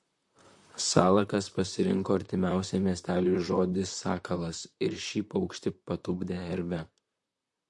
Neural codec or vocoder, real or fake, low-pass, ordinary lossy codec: vocoder, 44.1 kHz, 128 mel bands, Pupu-Vocoder; fake; 10.8 kHz; MP3, 48 kbps